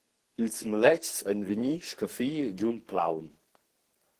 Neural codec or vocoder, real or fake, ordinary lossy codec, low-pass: codec, 44.1 kHz, 2.6 kbps, SNAC; fake; Opus, 16 kbps; 14.4 kHz